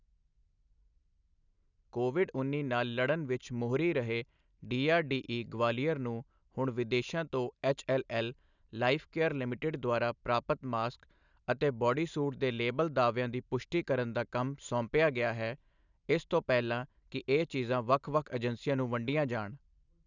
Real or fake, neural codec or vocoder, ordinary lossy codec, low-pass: real; none; none; 7.2 kHz